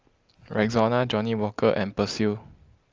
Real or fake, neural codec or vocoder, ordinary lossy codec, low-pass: real; none; Opus, 24 kbps; 7.2 kHz